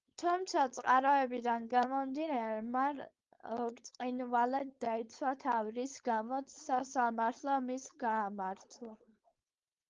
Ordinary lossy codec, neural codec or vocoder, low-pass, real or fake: Opus, 32 kbps; codec, 16 kHz, 4.8 kbps, FACodec; 7.2 kHz; fake